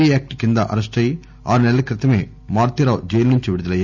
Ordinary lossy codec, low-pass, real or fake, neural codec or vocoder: none; 7.2 kHz; real; none